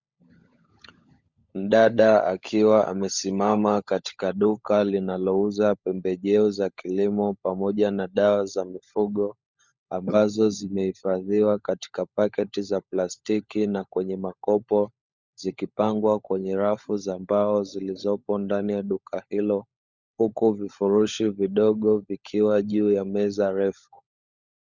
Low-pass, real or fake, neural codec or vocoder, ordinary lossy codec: 7.2 kHz; fake; codec, 16 kHz, 16 kbps, FunCodec, trained on LibriTTS, 50 frames a second; Opus, 64 kbps